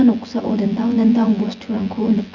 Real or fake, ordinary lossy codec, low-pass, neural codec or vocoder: fake; Opus, 64 kbps; 7.2 kHz; vocoder, 24 kHz, 100 mel bands, Vocos